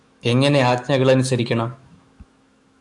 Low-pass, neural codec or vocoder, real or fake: 10.8 kHz; autoencoder, 48 kHz, 128 numbers a frame, DAC-VAE, trained on Japanese speech; fake